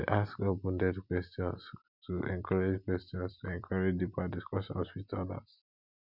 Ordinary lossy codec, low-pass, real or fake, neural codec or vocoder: none; 5.4 kHz; real; none